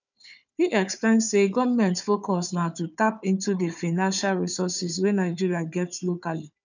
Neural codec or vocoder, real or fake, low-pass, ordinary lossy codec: codec, 16 kHz, 4 kbps, FunCodec, trained on Chinese and English, 50 frames a second; fake; 7.2 kHz; none